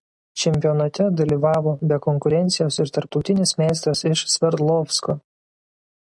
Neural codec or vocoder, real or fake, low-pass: none; real; 10.8 kHz